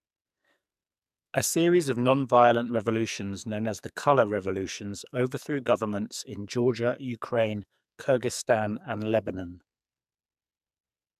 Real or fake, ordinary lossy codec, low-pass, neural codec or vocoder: fake; none; 14.4 kHz; codec, 44.1 kHz, 2.6 kbps, SNAC